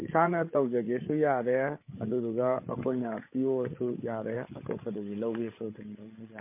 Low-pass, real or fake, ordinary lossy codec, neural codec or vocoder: 3.6 kHz; fake; MP3, 24 kbps; codec, 16 kHz, 8 kbps, FreqCodec, larger model